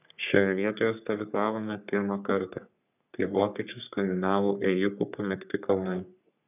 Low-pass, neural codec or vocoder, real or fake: 3.6 kHz; codec, 44.1 kHz, 3.4 kbps, Pupu-Codec; fake